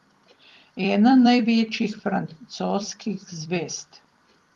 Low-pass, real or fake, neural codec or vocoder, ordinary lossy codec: 19.8 kHz; fake; vocoder, 44.1 kHz, 128 mel bands every 256 samples, BigVGAN v2; Opus, 24 kbps